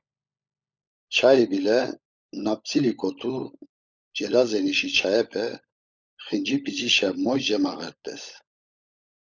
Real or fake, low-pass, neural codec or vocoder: fake; 7.2 kHz; codec, 16 kHz, 16 kbps, FunCodec, trained on LibriTTS, 50 frames a second